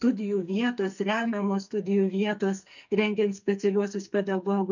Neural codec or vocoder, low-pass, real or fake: codec, 44.1 kHz, 2.6 kbps, SNAC; 7.2 kHz; fake